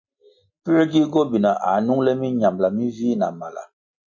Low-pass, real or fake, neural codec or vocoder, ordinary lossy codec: 7.2 kHz; real; none; MP3, 48 kbps